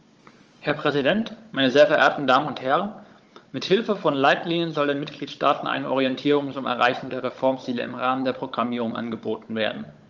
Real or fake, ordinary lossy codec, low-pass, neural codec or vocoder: fake; Opus, 24 kbps; 7.2 kHz; codec, 16 kHz, 16 kbps, FunCodec, trained on Chinese and English, 50 frames a second